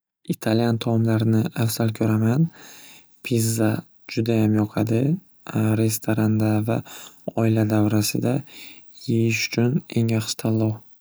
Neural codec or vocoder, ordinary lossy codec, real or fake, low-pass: none; none; real; none